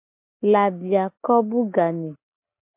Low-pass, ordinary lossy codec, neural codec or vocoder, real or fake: 3.6 kHz; MP3, 32 kbps; none; real